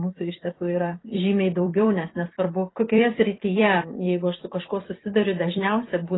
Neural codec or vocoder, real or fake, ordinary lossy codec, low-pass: vocoder, 44.1 kHz, 128 mel bands every 256 samples, BigVGAN v2; fake; AAC, 16 kbps; 7.2 kHz